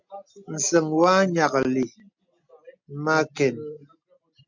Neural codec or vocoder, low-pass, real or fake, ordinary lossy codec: none; 7.2 kHz; real; MP3, 64 kbps